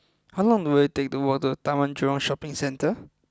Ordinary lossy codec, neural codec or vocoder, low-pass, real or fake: none; none; none; real